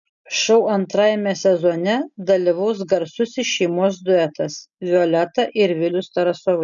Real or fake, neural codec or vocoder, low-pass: real; none; 7.2 kHz